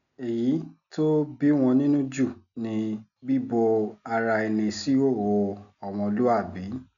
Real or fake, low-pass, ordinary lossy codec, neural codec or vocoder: real; 7.2 kHz; none; none